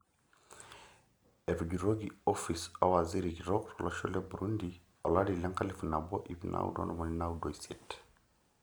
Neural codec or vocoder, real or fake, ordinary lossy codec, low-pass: none; real; none; none